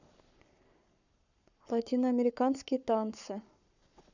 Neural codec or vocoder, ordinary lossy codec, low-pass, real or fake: codec, 44.1 kHz, 7.8 kbps, Pupu-Codec; none; 7.2 kHz; fake